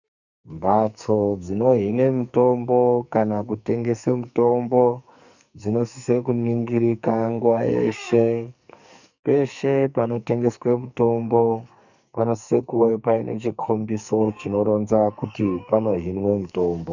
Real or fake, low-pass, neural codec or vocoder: fake; 7.2 kHz; codec, 44.1 kHz, 2.6 kbps, SNAC